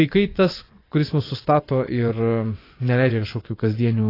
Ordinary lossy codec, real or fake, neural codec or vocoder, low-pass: AAC, 24 kbps; fake; vocoder, 24 kHz, 100 mel bands, Vocos; 5.4 kHz